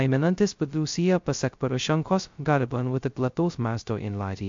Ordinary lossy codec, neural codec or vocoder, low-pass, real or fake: MP3, 48 kbps; codec, 16 kHz, 0.2 kbps, FocalCodec; 7.2 kHz; fake